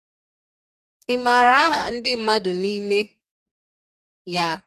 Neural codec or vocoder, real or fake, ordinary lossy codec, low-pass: codec, 44.1 kHz, 2.6 kbps, DAC; fake; none; 14.4 kHz